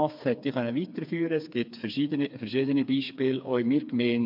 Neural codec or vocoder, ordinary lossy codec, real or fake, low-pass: codec, 16 kHz, 4 kbps, FreqCodec, smaller model; MP3, 48 kbps; fake; 5.4 kHz